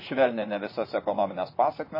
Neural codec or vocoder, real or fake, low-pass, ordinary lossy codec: vocoder, 44.1 kHz, 128 mel bands, Pupu-Vocoder; fake; 5.4 kHz; MP3, 24 kbps